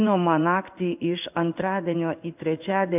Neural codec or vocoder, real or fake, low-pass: codec, 16 kHz in and 24 kHz out, 1 kbps, XY-Tokenizer; fake; 3.6 kHz